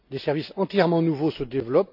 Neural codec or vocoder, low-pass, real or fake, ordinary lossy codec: none; 5.4 kHz; real; none